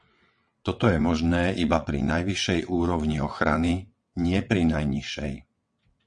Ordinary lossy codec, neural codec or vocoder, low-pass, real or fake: MP3, 48 kbps; vocoder, 22.05 kHz, 80 mel bands, WaveNeXt; 9.9 kHz; fake